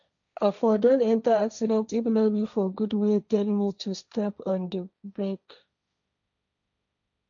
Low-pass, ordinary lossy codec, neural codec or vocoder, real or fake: 7.2 kHz; none; codec, 16 kHz, 1.1 kbps, Voila-Tokenizer; fake